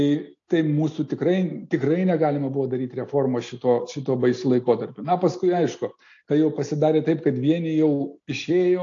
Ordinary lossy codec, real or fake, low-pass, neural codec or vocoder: AAC, 48 kbps; real; 7.2 kHz; none